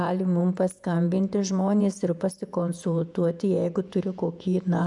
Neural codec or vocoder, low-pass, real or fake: vocoder, 48 kHz, 128 mel bands, Vocos; 10.8 kHz; fake